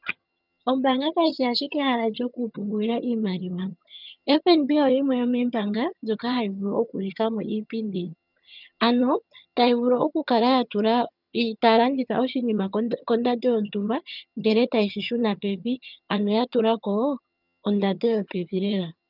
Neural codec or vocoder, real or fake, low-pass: vocoder, 22.05 kHz, 80 mel bands, HiFi-GAN; fake; 5.4 kHz